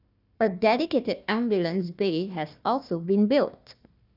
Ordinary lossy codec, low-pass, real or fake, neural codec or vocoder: none; 5.4 kHz; fake; codec, 16 kHz, 1 kbps, FunCodec, trained on Chinese and English, 50 frames a second